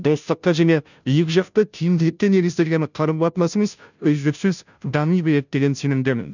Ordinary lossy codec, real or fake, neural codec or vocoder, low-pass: none; fake; codec, 16 kHz, 0.5 kbps, FunCodec, trained on Chinese and English, 25 frames a second; 7.2 kHz